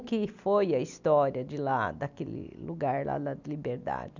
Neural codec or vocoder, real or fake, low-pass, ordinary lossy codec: none; real; 7.2 kHz; none